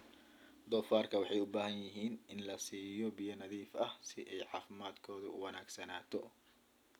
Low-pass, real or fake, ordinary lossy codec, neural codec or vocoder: none; real; none; none